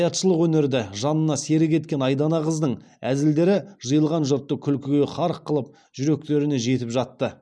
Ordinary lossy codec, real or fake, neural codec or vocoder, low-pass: none; real; none; none